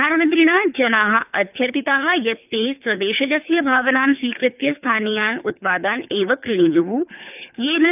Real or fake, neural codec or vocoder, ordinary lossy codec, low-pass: fake; codec, 24 kHz, 3 kbps, HILCodec; none; 3.6 kHz